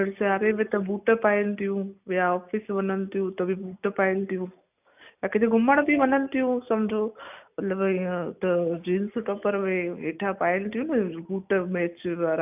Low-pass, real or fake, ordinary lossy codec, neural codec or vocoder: 3.6 kHz; real; none; none